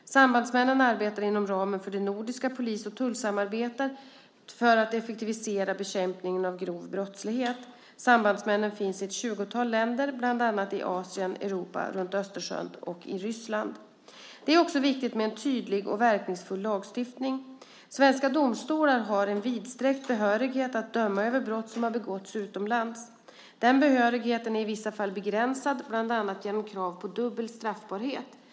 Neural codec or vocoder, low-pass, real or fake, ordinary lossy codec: none; none; real; none